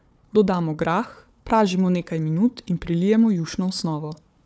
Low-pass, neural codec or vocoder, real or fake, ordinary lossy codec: none; codec, 16 kHz, 4 kbps, FunCodec, trained on Chinese and English, 50 frames a second; fake; none